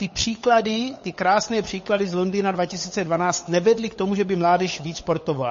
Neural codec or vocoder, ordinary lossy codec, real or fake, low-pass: codec, 16 kHz, 8 kbps, FunCodec, trained on LibriTTS, 25 frames a second; MP3, 32 kbps; fake; 7.2 kHz